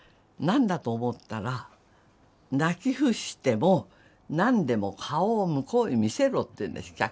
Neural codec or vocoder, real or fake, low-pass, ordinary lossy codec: none; real; none; none